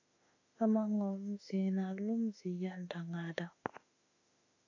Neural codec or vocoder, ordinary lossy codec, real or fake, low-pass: autoencoder, 48 kHz, 32 numbers a frame, DAC-VAE, trained on Japanese speech; AAC, 48 kbps; fake; 7.2 kHz